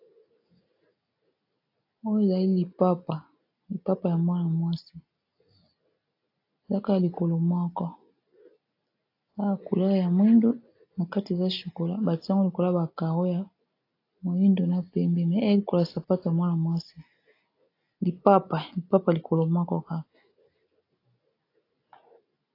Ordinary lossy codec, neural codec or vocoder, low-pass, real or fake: AAC, 32 kbps; none; 5.4 kHz; real